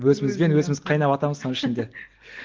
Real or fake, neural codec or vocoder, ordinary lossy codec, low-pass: real; none; Opus, 16 kbps; 7.2 kHz